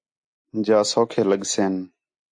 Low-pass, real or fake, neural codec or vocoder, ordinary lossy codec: 9.9 kHz; real; none; AAC, 64 kbps